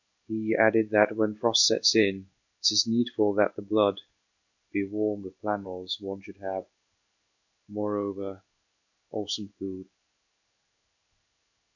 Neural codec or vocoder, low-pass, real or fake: codec, 16 kHz in and 24 kHz out, 1 kbps, XY-Tokenizer; 7.2 kHz; fake